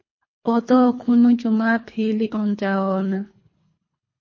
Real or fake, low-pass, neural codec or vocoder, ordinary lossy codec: fake; 7.2 kHz; codec, 24 kHz, 3 kbps, HILCodec; MP3, 32 kbps